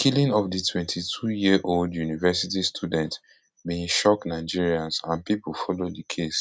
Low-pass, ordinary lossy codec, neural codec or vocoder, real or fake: none; none; none; real